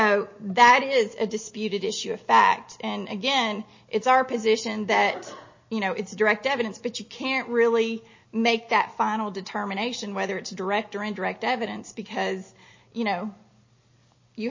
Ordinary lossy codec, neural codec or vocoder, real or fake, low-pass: MP3, 32 kbps; none; real; 7.2 kHz